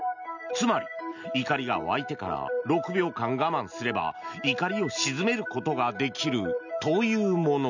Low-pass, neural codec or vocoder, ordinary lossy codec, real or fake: 7.2 kHz; none; none; real